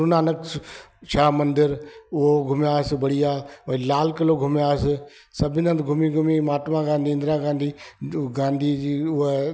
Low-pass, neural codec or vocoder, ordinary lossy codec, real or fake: none; none; none; real